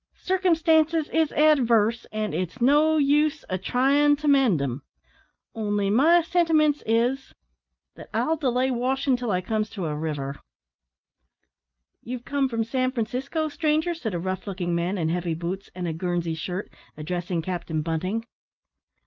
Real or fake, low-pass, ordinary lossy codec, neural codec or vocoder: real; 7.2 kHz; Opus, 24 kbps; none